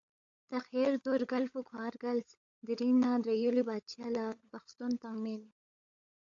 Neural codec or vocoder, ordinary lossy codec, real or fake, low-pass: codec, 16 kHz, 16 kbps, FunCodec, trained on LibriTTS, 50 frames a second; AAC, 48 kbps; fake; 7.2 kHz